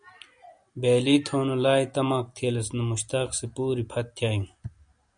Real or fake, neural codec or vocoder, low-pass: real; none; 9.9 kHz